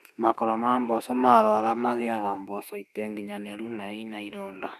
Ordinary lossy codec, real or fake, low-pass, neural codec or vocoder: none; fake; 14.4 kHz; autoencoder, 48 kHz, 32 numbers a frame, DAC-VAE, trained on Japanese speech